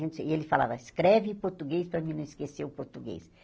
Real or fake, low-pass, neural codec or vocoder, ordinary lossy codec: real; none; none; none